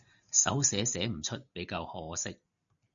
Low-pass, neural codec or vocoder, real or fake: 7.2 kHz; none; real